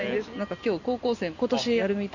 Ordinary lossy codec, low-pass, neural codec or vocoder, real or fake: none; 7.2 kHz; none; real